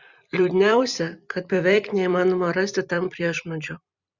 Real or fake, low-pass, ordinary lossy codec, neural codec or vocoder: fake; 7.2 kHz; Opus, 64 kbps; vocoder, 44.1 kHz, 128 mel bands every 256 samples, BigVGAN v2